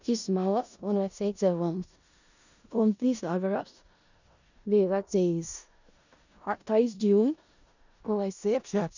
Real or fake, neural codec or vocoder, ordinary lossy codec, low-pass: fake; codec, 16 kHz in and 24 kHz out, 0.4 kbps, LongCat-Audio-Codec, four codebook decoder; none; 7.2 kHz